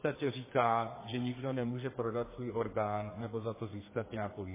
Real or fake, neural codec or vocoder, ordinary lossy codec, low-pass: fake; codec, 32 kHz, 1.9 kbps, SNAC; MP3, 16 kbps; 3.6 kHz